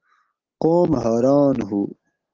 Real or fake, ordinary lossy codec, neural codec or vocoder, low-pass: real; Opus, 16 kbps; none; 7.2 kHz